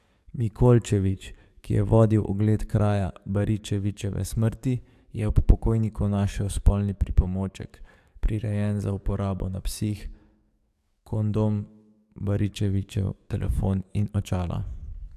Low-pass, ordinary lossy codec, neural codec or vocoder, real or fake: 14.4 kHz; AAC, 96 kbps; codec, 44.1 kHz, 7.8 kbps, DAC; fake